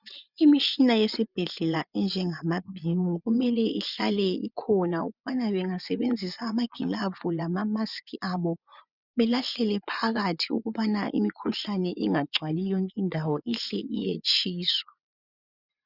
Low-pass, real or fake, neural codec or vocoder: 5.4 kHz; fake; vocoder, 24 kHz, 100 mel bands, Vocos